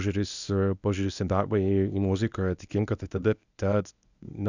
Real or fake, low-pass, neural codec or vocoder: fake; 7.2 kHz; codec, 24 kHz, 0.9 kbps, WavTokenizer, medium speech release version 1